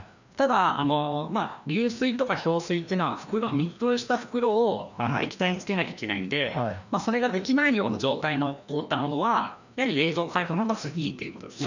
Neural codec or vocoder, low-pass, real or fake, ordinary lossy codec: codec, 16 kHz, 1 kbps, FreqCodec, larger model; 7.2 kHz; fake; none